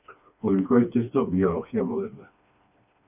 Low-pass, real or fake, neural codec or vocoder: 3.6 kHz; fake; codec, 16 kHz, 2 kbps, FreqCodec, smaller model